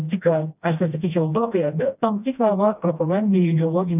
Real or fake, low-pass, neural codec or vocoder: fake; 3.6 kHz; codec, 16 kHz, 1 kbps, FreqCodec, smaller model